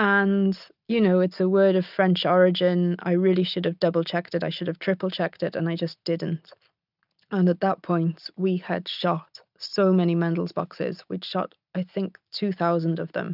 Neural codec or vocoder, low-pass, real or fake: none; 5.4 kHz; real